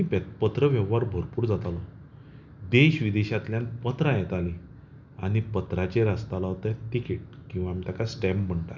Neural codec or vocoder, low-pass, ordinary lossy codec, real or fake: none; 7.2 kHz; none; real